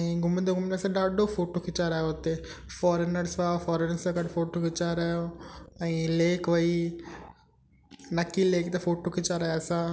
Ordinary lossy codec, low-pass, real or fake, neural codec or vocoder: none; none; real; none